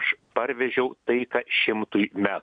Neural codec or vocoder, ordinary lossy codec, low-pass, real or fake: none; MP3, 96 kbps; 9.9 kHz; real